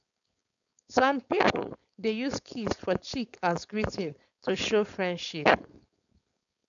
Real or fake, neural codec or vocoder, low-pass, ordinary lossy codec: fake; codec, 16 kHz, 4.8 kbps, FACodec; 7.2 kHz; none